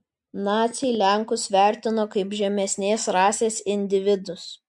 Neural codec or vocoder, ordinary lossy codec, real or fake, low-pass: none; MP3, 64 kbps; real; 10.8 kHz